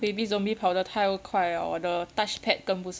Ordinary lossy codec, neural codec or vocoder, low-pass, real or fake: none; none; none; real